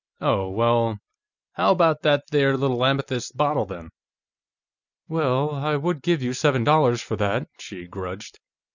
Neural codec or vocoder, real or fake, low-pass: none; real; 7.2 kHz